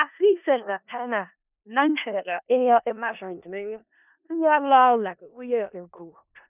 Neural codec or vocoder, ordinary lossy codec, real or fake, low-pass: codec, 16 kHz in and 24 kHz out, 0.4 kbps, LongCat-Audio-Codec, four codebook decoder; none; fake; 3.6 kHz